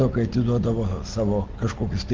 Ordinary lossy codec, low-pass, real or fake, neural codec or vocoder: Opus, 16 kbps; 7.2 kHz; real; none